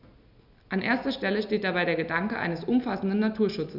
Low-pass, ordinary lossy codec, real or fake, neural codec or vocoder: 5.4 kHz; none; real; none